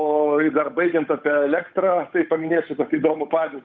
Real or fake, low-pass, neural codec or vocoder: fake; 7.2 kHz; codec, 16 kHz, 8 kbps, FunCodec, trained on Chinese and English, 25 frames a second